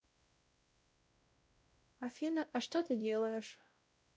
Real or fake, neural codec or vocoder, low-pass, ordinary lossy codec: fake; codec, 16 kHz, 0.5 kbps, X-Codec, WavLM features, trained on Multilingual LibriSpeech; none; none